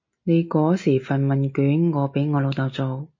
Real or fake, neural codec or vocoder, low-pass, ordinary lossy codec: real; none; 7.2 kHz; MP3, 32 kbps